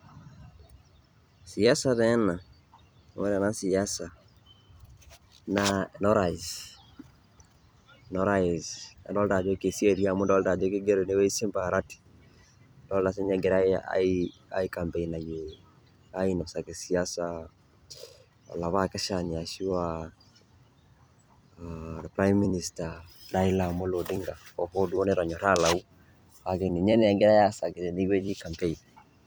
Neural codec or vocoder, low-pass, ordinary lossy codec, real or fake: vocoder, 44.1 kHz, 128 mel bands every 256 samples, BigVGAN v2; none; none; fake